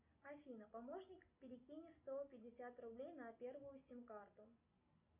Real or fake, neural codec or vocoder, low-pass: real; none; 3.6 kHz